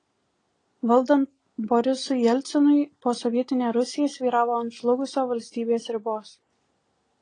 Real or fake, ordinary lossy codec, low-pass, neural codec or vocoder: real; AAC, 32 kbps; 9.9 kHz; none